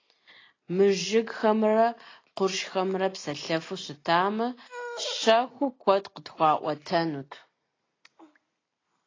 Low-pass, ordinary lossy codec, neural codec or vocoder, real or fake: 7.2 kHz; AAC, 32 kbps; none; real